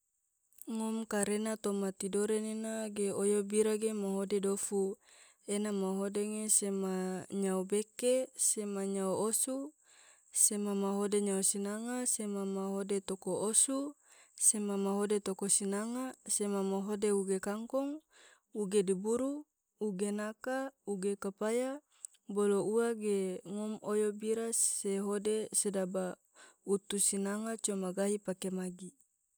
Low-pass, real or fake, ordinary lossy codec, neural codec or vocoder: none; real; none; none